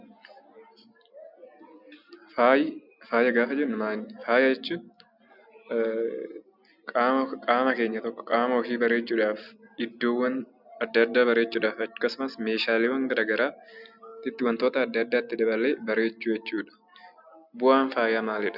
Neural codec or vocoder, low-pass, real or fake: none; 5.4 kHz; real